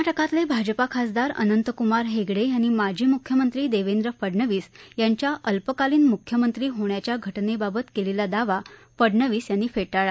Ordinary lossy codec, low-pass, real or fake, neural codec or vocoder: none; none; real; none